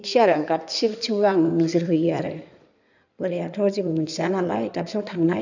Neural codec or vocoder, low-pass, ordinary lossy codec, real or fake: codec, 16 kHz in and 24 kHz out, 2.2 kbps, FireRedTTS-2 codec; 7.2 kHz; none; fake